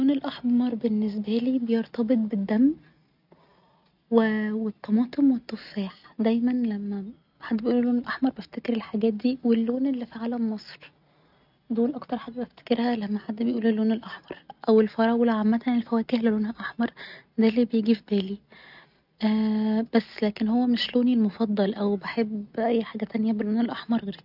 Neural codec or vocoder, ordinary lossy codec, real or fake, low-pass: none; none; real; 5.4 kHz